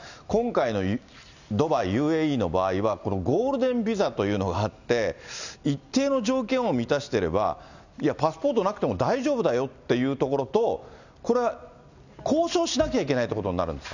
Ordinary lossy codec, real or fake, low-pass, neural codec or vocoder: none; real; 7.2 kHz; none